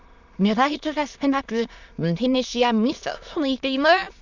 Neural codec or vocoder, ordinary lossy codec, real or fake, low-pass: autoencoder, 22.05 kHz, a latent of 192 numbers a frame, VITS, trained on many speakers; none; fake; 7.2 kHz